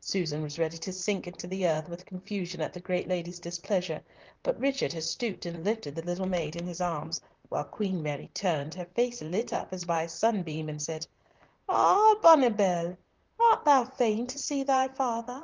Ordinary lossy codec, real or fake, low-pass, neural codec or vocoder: Opus, 24 kbps; fake; 7.2 kHz; vocoder, 44.1 kHz, 128 mel bands, Pupu-Vocoder